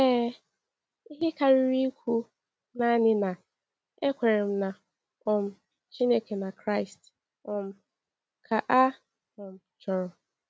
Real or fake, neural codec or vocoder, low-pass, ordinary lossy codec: real; none; none; none